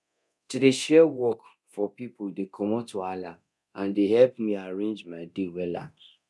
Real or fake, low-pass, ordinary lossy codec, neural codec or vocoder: fake; none; none; codec, 24 kHz, 0.9 kbps, DualCodec